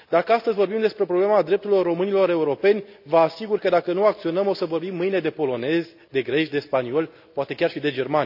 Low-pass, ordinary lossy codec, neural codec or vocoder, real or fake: 5.4 kHz; none; none; real